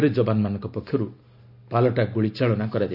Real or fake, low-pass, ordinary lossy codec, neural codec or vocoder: real; 5.4 kHz; none; none